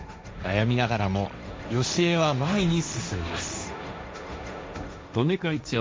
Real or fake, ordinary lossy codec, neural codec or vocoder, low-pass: fake; none; codec, 16 kHz, 1.1 kbps, Voila-Tokenizer; none